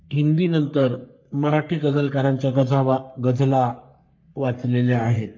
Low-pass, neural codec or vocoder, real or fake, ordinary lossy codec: 7.2 kHz; codec, 44.1 kHz, 3.4 kbps, Pupu-Codec; fake; MP3, 48 kbps